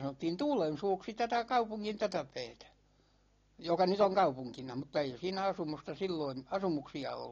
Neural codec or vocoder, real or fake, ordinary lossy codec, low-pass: none; real; AAC, 32 kbps; 7.2 kHz